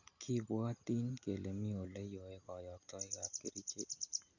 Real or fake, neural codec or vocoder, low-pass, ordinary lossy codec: real; none; 7.2 kHz; none